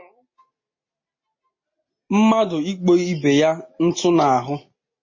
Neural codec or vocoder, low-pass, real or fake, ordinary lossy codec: none; 7.2 kHz; real; MP3, 32 kbps